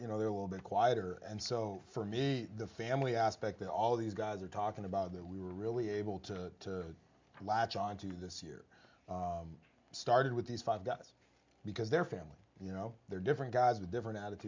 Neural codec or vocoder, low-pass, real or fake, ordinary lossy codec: none; 7.2 kHz; real; MP3, 64 kbps